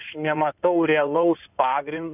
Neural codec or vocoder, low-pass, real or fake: codec, 16 kHz in and 24 kHz out, 2.2 kbps, FireRedTTS-2 codec; 3.6 kHz; fake